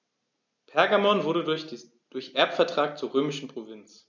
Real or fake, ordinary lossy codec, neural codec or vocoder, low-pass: real; none; none; 7.2 kHz